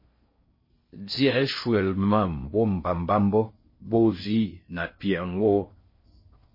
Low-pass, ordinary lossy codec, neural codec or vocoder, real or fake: 5.4 kHz; MP3, 24 kbps; codec, 16 kHz in and 24 kHz out, 0.6 kbps, FocalCodec, streaming, 4096 codes; fake